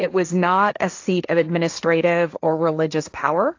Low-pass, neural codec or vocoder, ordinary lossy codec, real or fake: 7.2 kHz; codec, 16 kHz, 1.1 kbps, Voila-Tokenizer; AAC, 48 kbps; fake